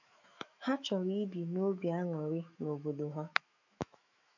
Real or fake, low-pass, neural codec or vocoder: fake; 7.2 kHz; autoencoder, 48 kHz, 128 numbers a frame, DAC-VAE, trained on Japanese speech